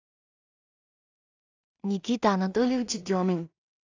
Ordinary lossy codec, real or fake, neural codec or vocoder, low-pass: AAC, 48 kbps; fake; codec, 16 kHz in and 24 kHz out, 0.4 kbps, LongCat-Audio-Codec, two codebook decoder; 7.2 kHz